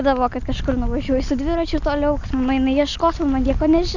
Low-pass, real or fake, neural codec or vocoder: 7.2 kHz; real; none